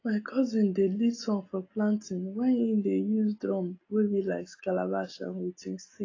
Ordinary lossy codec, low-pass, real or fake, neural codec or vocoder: AAC, 32 kbps; 7.2 kHz; real; none